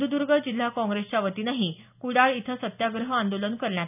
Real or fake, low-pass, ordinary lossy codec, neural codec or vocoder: real; 3.6 kHz; none; none